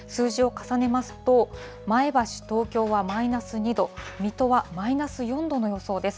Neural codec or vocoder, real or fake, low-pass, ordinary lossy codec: none; real; none; none